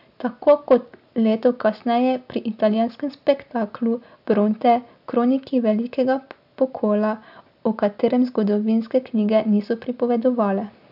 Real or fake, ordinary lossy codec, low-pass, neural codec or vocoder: real; none; 5.4 kHz; none